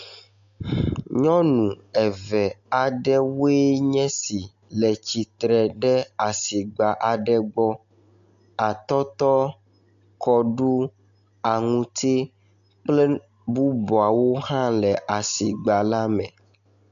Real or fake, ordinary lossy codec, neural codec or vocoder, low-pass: real; MP3, 64 kbps; none; 7.2 kHz